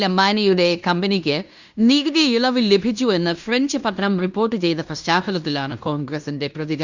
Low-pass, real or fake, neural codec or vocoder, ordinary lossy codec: 7.2 kHz; fake; codec, 16 kHz in and 24 kHz out, 0.9 kbps, LongCat-Audio-Codec, fine tuned four codebook decoder; Opus, 64 kbps